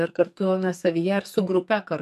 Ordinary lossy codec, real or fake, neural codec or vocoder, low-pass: MP3, 64 kbps; fake; codec, 44.1 kHz, 2.6 kbps, SNAC; 14.4 kHz